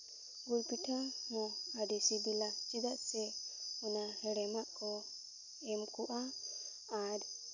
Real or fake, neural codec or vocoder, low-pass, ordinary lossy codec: real; none; 7.2 kHz; none